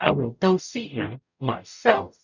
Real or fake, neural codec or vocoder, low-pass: fake; codec, 44.1 kHz, 0.9 kbps, DAC; 7.2 kHz